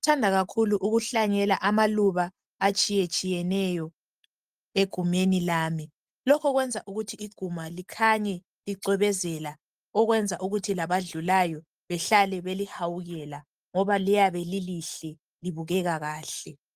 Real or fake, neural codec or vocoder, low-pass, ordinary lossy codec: real; none; 14.4 kHz; Opus, 32 kbps